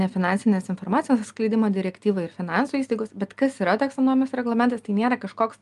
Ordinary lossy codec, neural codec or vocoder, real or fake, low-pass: Opus, 32 kbps; none; real; 10.8 kHz